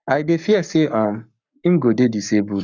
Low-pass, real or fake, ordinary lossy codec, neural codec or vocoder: 7.2 kHz; fake; none; codec, 44.1 kHz, 7.8 kbps, Pupu-Codec